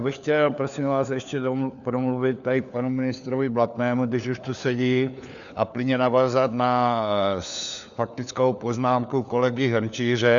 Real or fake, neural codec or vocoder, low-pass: fake; codec, 16 kHz, 4 kbps, FunCodec, trained on LibriTTS, 50 frames a second; 7.2 kHz